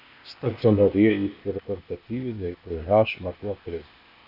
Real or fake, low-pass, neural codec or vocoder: fake; 5.4 kHz; codec, 16 kHz, 0.8 kbps, ZipCodec